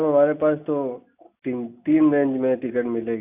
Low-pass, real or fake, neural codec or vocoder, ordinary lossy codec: 3.6 kHz; real; none; AAC, 32 kbps